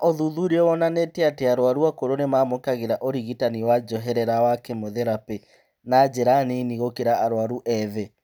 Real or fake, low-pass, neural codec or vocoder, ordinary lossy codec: real; none; none; none